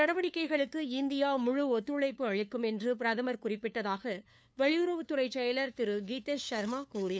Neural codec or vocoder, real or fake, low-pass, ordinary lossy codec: codec, 16 kHz, 2 kbps, FunCodec, trained on LibriTTS, 25 frames a second; fake; none; none